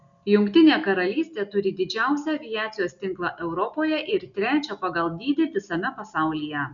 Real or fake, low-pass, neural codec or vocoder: real; 7.2 kHz; none